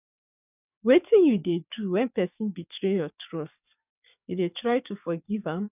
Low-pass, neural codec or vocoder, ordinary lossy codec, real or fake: 3.6 kHz; codec, 44.1 kHz, 7.8 kbps, DAC; none; fake